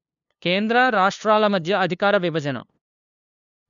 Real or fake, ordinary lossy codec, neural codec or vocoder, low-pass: fake; none; codec, 16 kHz, 2 kbps, FunCodec, trained on LibriTTS, 25 frames a second; 7.2 kHz